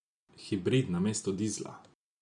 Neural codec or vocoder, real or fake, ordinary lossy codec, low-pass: none; real; none; 10.8 kHz